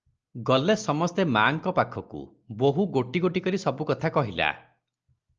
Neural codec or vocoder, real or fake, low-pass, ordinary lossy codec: none; real; 7.2 kHz; Opus, 24 kbps